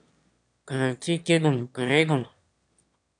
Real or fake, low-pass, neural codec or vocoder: fake; 9.9 kHz; autoencoder, 22.05 kHz, a latent of 192 numbers a frame, VITS, trained on one speaker